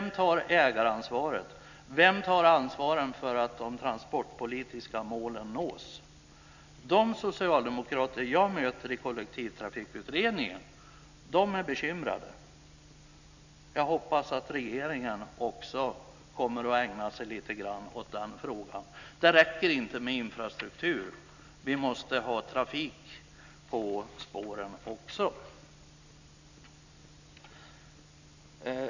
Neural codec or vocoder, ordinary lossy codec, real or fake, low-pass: none; none; real; 7.2 kHz